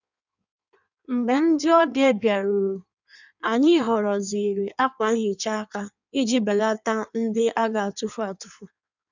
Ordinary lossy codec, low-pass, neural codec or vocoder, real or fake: none; 7.2 kHz; codec, 16 kHz in and 24 kHz out, 1.1 kbps, FireRedTTS-2 codec; fake